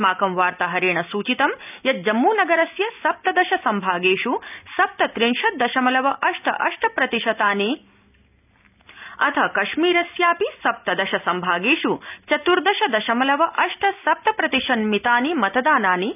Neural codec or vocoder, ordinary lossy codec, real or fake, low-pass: none; none; real; 3.6 kHz